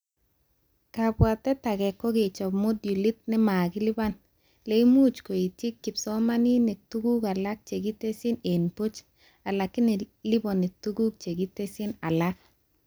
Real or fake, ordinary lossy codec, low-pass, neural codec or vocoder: real; none; none; none